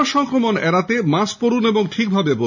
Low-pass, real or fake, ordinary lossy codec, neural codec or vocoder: 7.2 kHz; real; none; none